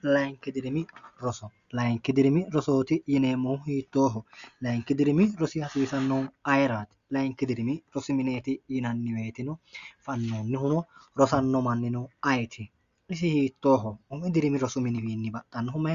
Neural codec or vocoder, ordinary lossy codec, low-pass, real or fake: none; Opus, 64 kbps; 7.2 kHz; real